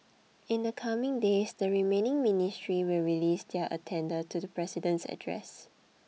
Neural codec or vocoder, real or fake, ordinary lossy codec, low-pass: none; real; none; none